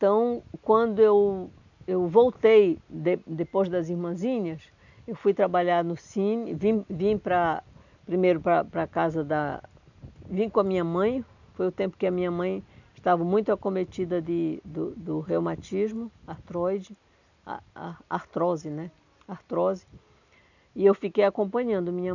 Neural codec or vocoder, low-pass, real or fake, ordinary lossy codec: none; 7.2 kHz; real; none